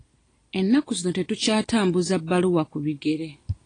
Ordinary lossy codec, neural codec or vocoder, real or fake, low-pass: AAC, 32 kbps; none; real; 9.9 kHz